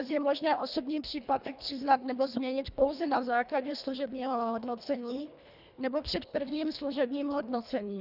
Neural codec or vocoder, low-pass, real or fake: codec, 24 kHz, 1.5 kbps, HILCodec; 5.4 kHz; fake